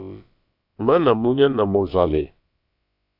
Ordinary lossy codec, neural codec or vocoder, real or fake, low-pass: AAC, 48 kbps; codec, 16 kHz, about 1 kbps, DyCAST, with the encoder's durations; fake; 5.4 kHz